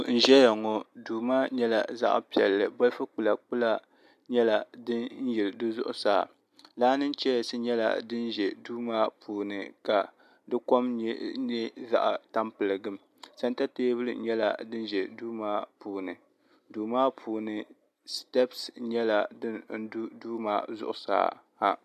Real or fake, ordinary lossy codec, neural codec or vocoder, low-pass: real; MP3, 96 kbps; none; 14.4 kHz